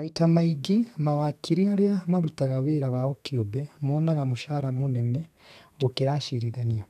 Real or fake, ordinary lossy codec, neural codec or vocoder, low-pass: fake; none; codec, 32 kHz, 1.9 kbps, SNAC; 14.4 kHz